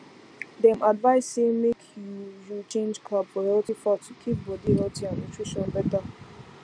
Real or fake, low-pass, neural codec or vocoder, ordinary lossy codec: real; 9.9 kHz; none; none